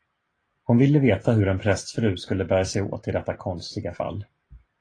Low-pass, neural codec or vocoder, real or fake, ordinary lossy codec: 9.9 kHz; none; real; AAC, 32 kbps